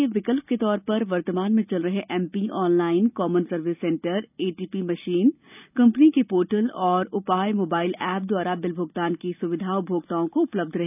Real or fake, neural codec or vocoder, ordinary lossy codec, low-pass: real; none; none; 3.6 kHz